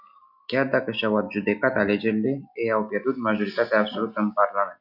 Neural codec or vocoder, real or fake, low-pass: none; real; 5.4 kHz